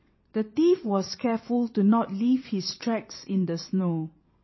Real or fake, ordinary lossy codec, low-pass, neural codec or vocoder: fake; MP3, 24 kbps; 7.2 kHz; vocoder, 22.05 kHz, 80 mel bands, WaveNeXt